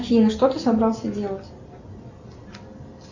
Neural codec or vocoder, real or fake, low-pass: none; real; 7.2 kHz